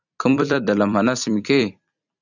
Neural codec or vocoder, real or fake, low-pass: vocoder, 44.1 kHz, 128 mel bands every 256 samples, BigVGAN v2; fake; 7.2 kHz